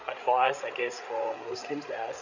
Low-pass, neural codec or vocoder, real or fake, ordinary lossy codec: 7.2 kHz; codec, 16 kHz, 8 kbps, FreqCodec, larger model; fake; none